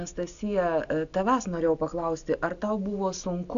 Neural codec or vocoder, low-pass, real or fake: none; 7.2 kHz; real